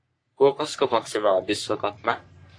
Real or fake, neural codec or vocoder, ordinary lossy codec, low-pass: fake; codec, 44.1 kHz, 3.4 kbps, Pupu-Codec; AAC, 48 kbps; 9.9 kHz